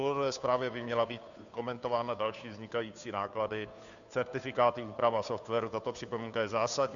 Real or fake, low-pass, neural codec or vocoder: fake; 7.2 kHz; codec, 16 kHz, 2 kbps, FunCodec, trained on Chinese and English, 25 frames a second